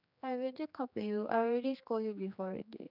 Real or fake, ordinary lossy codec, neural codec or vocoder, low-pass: fake; none; codec, 16 kHz, 2 kbps, X-Codec, HuBERT features, trained on general audio; 5.4 kHz